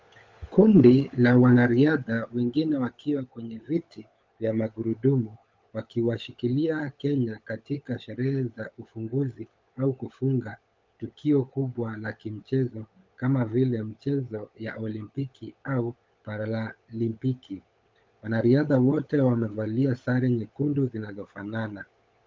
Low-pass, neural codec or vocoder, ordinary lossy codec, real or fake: 7.2 kHz; codec, 16 kHz, 16 kbps, FunCodec, trained on LibriTTS, 50 frames a second; Opus, 32 kbps; fake